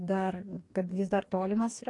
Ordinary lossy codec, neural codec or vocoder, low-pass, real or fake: AAC, 48 kbps; codec, 44.1 kHz, 2.6 kbps, DAC; 10.8 kHz; fake